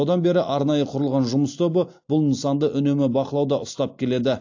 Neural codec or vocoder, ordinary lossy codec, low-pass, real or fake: none; AAC, 48 kbps; 7.2 kHz; real